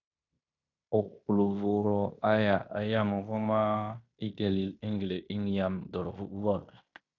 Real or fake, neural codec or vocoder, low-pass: fake; codec, 16 kHz in and 24 kHz out, 0.9 kbps, LongCat-Audio-Codec, fine tuned four codebook decoder; 7.2 kHz